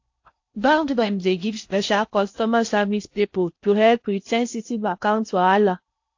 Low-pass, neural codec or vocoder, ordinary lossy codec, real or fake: 7.2 kHz; codec, 16 kHz in and 24 kHz out, 0.6 kbps, FocalCodec, streaming, 4096 codes; AAC, 48 kbps; fake